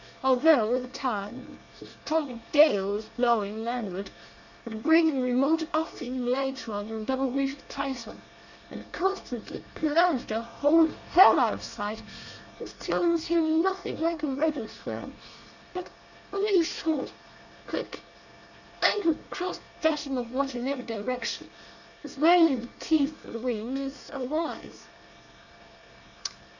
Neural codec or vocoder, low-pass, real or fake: codec, 24 kHz, 1 kbps, SNAC; 7.2 kHz; fake